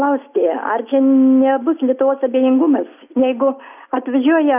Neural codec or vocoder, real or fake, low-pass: none; real; 3.6 kHz